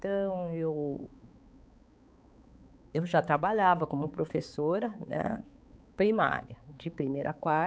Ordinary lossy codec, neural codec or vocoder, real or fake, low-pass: none; codec, 16 kHz, 4 kbps, X-Codec, HuBERT features, trained on balanced general audio; fake; none